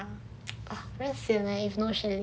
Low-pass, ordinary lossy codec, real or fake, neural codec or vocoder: none; none; real; none